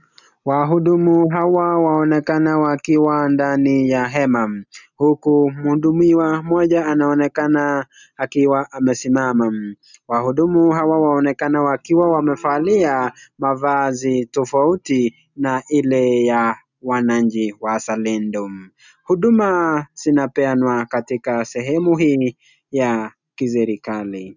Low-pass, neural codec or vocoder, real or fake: 7.2 kHz; none; real